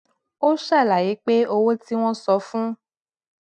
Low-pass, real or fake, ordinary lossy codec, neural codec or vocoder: 10.8 kHz; real; none; none